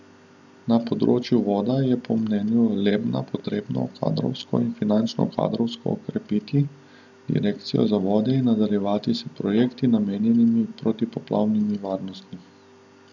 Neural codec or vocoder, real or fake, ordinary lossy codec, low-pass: none; real; none; 7.2 kHz